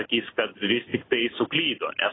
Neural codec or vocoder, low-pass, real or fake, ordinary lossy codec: none; 7.2 kHz; real; AAC, 16 kbps